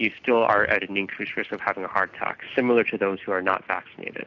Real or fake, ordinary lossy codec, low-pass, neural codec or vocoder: real; AAC, 48 kbps; 7.2 kHz; none